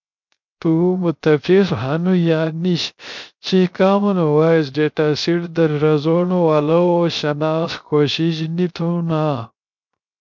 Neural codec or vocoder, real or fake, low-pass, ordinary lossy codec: codec, 16 kHz, 0.3 kbps, FocalCodec; fake; 7.2 kHz; MP3, 64 kbps